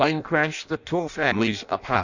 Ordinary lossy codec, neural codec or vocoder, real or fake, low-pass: Opus, 64 kbps; codec, 16 kHz in and 24 kHz out, 0.6 kbps, FireRedTTS-2 codec; fake; 7.2 kHz